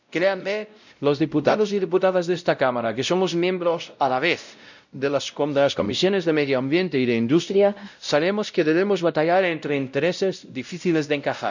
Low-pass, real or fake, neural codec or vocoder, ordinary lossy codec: 7.2 kHz; fake; codec, 16 kHz, 0.5 kbps, X-Codec, WavLM features, trained on Multilingual LibriSpeech; none